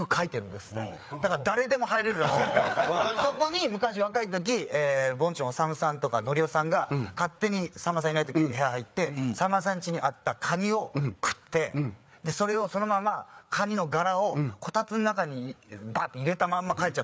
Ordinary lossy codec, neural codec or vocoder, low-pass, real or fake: none; codec, 16 kHz, 4 kbps, FreqCodec, larger model; none; fake